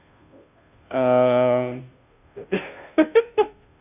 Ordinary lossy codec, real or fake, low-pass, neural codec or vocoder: none; fake; 3.6 kHz; codec, 16 kHz, 0.5 kbps, FunCodec, trained on Chinese and English, 25 frames a second